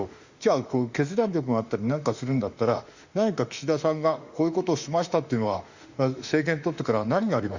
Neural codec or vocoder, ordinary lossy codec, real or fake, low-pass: autoencoder, 48 kHz, 32 numbers a frame, DAC-VAE, trained on Japanese speech; Opus, 64 kbps; fake; 7.2 kHz